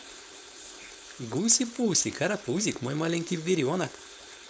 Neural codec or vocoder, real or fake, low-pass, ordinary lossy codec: codec, 16 kHz, 4.8 kbps, FACodec; fake; none; none